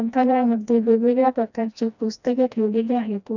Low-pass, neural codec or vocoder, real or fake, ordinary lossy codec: 7.2 kHz; codec, 16 kHz, 1 kbps, FreqCodec, smaller model; fake; none